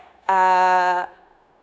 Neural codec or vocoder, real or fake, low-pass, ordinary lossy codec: codec, 16 kHz, 0.9 kbps, LongCat-Audio-Codec; fake; none; none